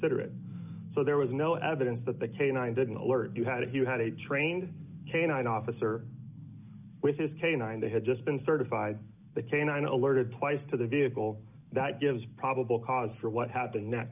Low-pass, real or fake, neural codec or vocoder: 3.6 kHz; real; none